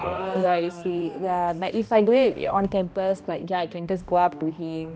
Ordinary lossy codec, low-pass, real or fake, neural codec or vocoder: none; none; fake; codec, 16 kHz, 1 kbps, X-Codec, HuBERT features, trained on balanced general audio